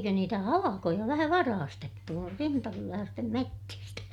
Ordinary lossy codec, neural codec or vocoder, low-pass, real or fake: none; none; 19.8 kHz; real